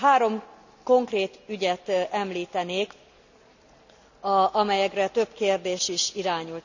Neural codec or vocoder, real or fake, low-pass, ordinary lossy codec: none; real; 7.2 kHz; none